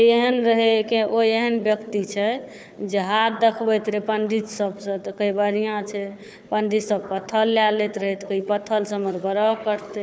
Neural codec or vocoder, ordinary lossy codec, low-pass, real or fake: codec, 16 kHz, 4 kbps, FunCodec, trained on Chinese and English, 50 frames a second; none; none; fake